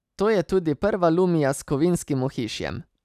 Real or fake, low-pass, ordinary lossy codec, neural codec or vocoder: real; 14.4 kHz; none; none